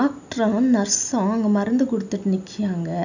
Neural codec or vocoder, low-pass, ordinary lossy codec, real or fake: none; 7.2 kHz; none; real